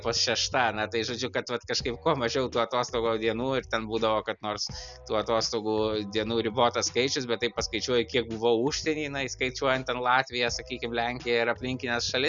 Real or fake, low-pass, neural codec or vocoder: real; 7.2 kHz; none